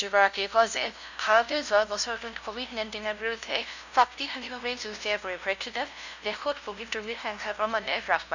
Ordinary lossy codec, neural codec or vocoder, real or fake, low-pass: none; codec, 16 kHz, 0.5 kbps, FunCodec, trained on LibriTTS, 25 frames a second; fake; 7.2 kHz